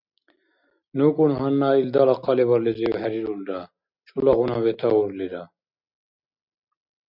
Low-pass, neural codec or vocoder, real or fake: 5.4 kHz; none; real